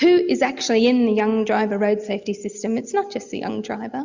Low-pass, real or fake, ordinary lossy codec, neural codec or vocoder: 7.2 kHz; real; Opus, 64 kbps; none